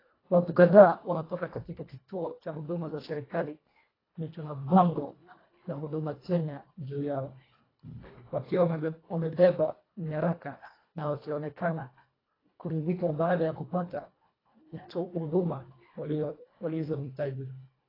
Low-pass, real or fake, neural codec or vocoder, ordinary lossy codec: 5.4 kHz; fake; codec, 24 kHz, 1.5 kbps, HILCodec; AAC, 24 kbps